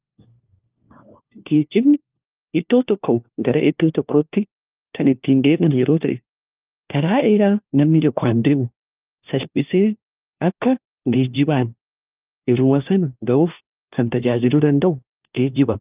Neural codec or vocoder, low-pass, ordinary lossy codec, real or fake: codec, 16 kHz, 1 kbps, FunCodec, trained on LibriTTS, 50 frames a second; 3.6 kHz; Opus, 24 kbps; fake